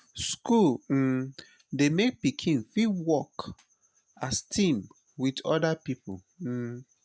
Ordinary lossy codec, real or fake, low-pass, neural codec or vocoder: none; real; none; none